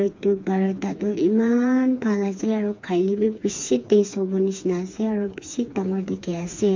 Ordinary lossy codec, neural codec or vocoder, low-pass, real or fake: MP3, 48 kbps; codec, 16 kHz, 4 kbps, FreqCodec, smaller model; 7.2 kHz; fake